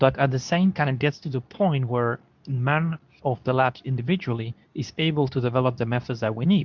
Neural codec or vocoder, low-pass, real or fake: codec, 24 kHz, 0.9 kbps, WavTokenizer, medium speech release version 2; 7.2 kHz; fake